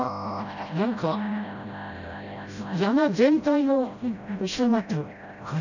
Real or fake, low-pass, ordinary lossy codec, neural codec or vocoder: fake; 7.2 kHz; none; codec, 16 kHz, 0.5 kbps, FreqCodec, smaller model